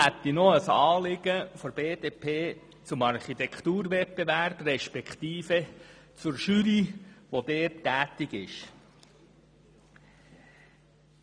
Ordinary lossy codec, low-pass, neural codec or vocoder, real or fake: none; 9.9 kHz; none; real